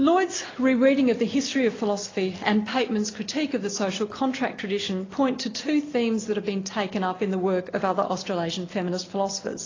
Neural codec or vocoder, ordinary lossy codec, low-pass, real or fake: none; AAC, 32 kbps; 7.2 kHz; real